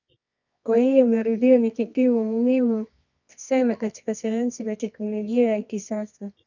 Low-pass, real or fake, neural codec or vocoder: 7.2 kHz; fake; codec, 24 kHz, 0.9 kbps, WavTokenizer, medium music audio release